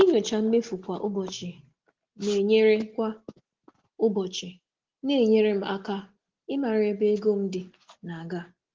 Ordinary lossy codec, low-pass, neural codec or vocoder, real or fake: Opus, 16 kbps; 7.2 kHz; none; real